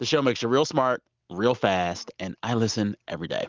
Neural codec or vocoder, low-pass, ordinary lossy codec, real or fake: none; 7.2 kHz; Opus, 24 kbps; real